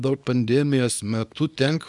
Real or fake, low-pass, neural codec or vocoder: fake; 10.8 kHz; codec, 24 kHz, 0.9 kbps, WavTokenizer, small release